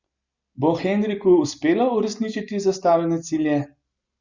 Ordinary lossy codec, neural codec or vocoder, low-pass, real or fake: Opus, 64 kbps; none; 7.2 kHz; real